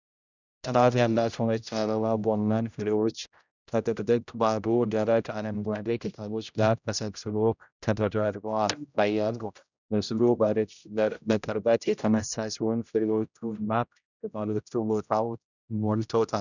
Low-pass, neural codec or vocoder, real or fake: 7.2 kHz; codec, 16 kHz, 0.5 kbps, X-Codec, HuBERT features, trained on general audio; fake